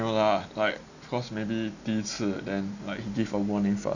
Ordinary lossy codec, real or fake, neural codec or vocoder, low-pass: none; real; none; 7.2 kHz